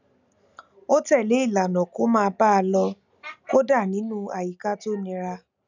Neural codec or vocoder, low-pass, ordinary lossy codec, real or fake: none; 7.2 kHz; none; real